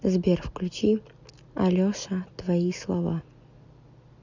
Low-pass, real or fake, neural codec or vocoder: 7.2 kHz; real; none